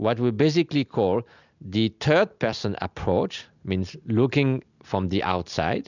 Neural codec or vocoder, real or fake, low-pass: none; real; 7.2 kHz